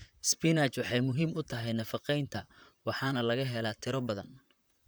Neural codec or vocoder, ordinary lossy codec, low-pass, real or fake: vocoder, 44.1 kHz, 128 mel bands, Pupu-Vocoder; none; none; fake